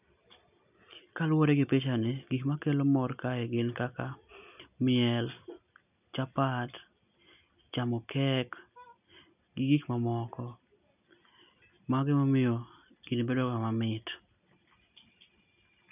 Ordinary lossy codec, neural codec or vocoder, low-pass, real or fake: none; none; 3.6 kHz; real